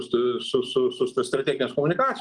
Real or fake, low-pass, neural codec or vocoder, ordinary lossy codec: real; 10.8 kHz; none; Opus, 24 kbps